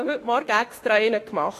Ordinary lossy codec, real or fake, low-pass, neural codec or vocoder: AAC, 48 kbps; fake; 14.4 kHz; autoencoder, 48 kHz, 32 numbers a frame, DAC-VAE, trained on Japanese speech